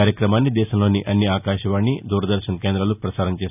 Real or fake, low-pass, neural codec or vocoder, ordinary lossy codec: real; 3.6 kHz; none; none